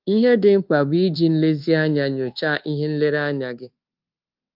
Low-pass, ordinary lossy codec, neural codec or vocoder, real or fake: 5.4 kHz; Opus, 32 kbps; codec, 24 kHz, 1.2 kbps, DualCodec; fake